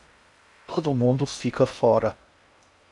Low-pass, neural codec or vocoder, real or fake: 10.8 kHz; codec, 16 kHz in and 24 kHz out, 0.6 kbps, FocalCodec, streaming, 2048 codes; fake